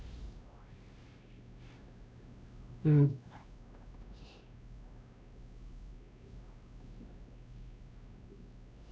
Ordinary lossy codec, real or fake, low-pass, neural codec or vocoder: none; fake; none; codec, 16 kHz, 1 kbps, X-Codec, WavLM features, trained on Multilingual LibriSpeech